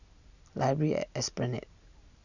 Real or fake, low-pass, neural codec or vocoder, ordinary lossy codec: real; 7.2 kHz; none; none